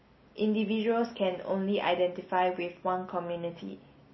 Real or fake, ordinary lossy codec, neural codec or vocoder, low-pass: real; MP3, 24 kbps; none; 7.2 kHz